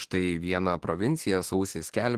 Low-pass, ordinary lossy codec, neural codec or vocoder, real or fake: 14.4 kHz; Opus, 16 kbps; autoencoder, 48 kHz, 32 numbers a frame, DAC-VAE, trained on Japanese speech; fake